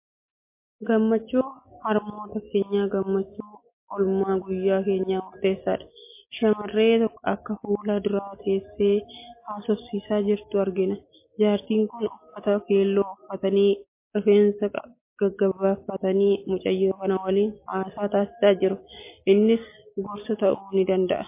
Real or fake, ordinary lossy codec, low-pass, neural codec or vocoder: real; MP3, 24 kbps; 3.6 kHz; none